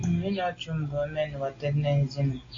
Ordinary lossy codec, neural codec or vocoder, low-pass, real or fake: AAC, 32 kbps; none; 7.2 kHz; real